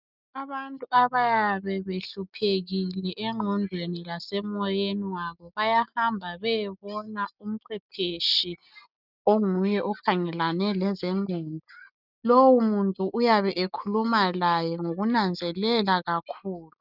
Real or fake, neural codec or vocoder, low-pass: real; none; 5.4 kHz